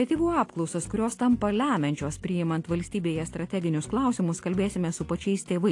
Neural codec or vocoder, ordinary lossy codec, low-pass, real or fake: none; AAC, 48 kbps; 10.8 kHz; real